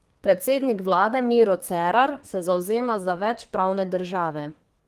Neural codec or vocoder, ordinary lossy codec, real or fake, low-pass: codec, 32 kHz, 1.9 kbps, SNAC; Opus, 24 kbps; fake; 14.4 kHz